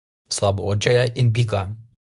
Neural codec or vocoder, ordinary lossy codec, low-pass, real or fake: codec, 24 kHz, 0.9 kbps, WavTokenizer, medium speech release version 2; none; 10.8 kHz; fake